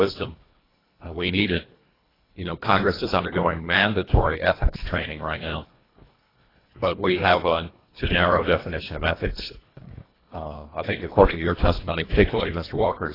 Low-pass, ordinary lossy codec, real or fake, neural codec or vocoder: 5.4 kHz; AAC, 24 kbps; fake; codec, 24 kHz, 1.5 kbps, HILCodec